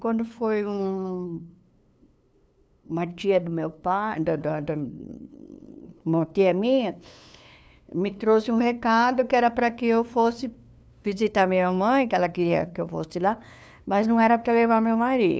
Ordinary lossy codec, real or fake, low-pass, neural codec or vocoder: none; fake; none; codec, 16 kHz, 2 kbps, FunCodec, trained on LibriTTS, 25 frames a second